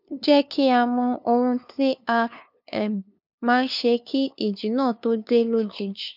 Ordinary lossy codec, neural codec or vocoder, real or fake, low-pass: none; codec, 16 kHz, 2 kbps, FunCodec, trained on LibriTTS, 25 frames a second; fake; 5.4 kHz